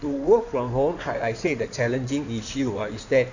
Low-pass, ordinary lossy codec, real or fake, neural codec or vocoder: 7.2 kHz; none; fake; codec, 16 kHz in and 24 kHz out, 2.2 kbps, FireRedTTS-2 codec